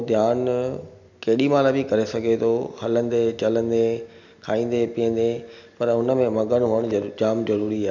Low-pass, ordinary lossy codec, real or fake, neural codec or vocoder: 7.2 kHz; none; real; none